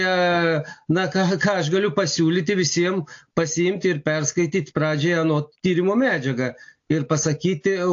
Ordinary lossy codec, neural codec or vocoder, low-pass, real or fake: AAC, 48 kbps; none; 7.2 kHz; real